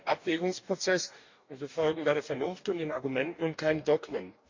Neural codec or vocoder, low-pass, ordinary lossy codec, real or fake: codec, 44.1 kHz, 2.6 kbps, DAC; 7.2 kHz; MP3, 64 kbps; fake